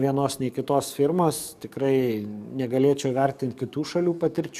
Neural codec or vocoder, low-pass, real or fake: codec, 44.1 kHz, 7.8 kbps, DAC; 14.4 kHz; fake